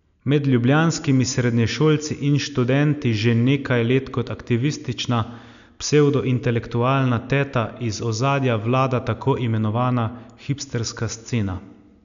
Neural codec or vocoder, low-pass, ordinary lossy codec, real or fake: none; 7.2 kHz; none; real